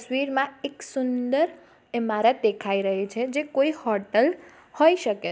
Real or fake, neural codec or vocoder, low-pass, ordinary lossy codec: real; none; none; none